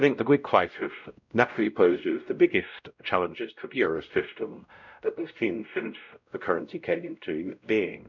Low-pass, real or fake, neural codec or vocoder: 7.2 kHz; fake; codec, 16 kHz, 0.5 kbps, X-Codec, WavLM features, trained on Multilingual LibriSpeech